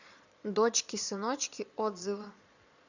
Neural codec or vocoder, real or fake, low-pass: vocoder, 22.05 kHz, 80 mel bands, Vocos; fake; 7.2 kHz